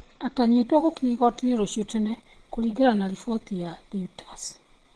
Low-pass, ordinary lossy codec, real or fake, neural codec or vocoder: 9.9 kHz; Opus, 16 kbps; fake; vocoder, 22.05 kHz, 80 mel bands, Vocos